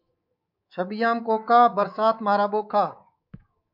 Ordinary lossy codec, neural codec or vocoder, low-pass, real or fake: MP3, 48 kbps; codec, 24 kHz, 3.1 kbps, DualCodec; 5.4 kHz; fake